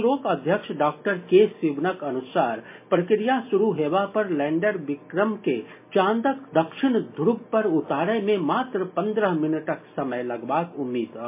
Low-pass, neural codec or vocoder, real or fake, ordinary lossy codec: 3.6 kHz; none; real; MP3, 24 kbps